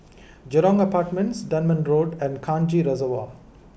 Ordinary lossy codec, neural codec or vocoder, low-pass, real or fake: none; none; none; real